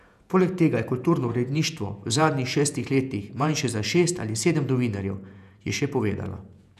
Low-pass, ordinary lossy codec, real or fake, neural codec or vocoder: 14.4 kHz; none; fake; vocoder, 48 kHz, 128 mel bands, Vocos